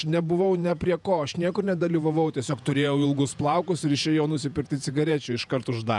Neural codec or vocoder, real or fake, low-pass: vocoder, 48 kHz, 128 mel bands, Vocos; fake; 10.8 kHz